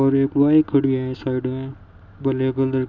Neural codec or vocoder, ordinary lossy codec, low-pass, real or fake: none; none; 7.2 kHz; real